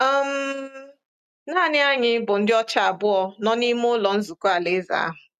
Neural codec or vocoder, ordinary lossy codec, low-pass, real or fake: none; none; 14.4 kHz; real